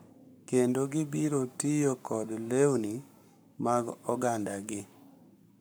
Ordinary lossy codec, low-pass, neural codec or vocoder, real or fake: none; none; codec, 44.1 kHz, 7.8 kbps, Pupu-Codec; fake